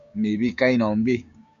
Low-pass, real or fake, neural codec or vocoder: 7.2 kHz; fake; codec, 16 kHz, 6 kbps, DAC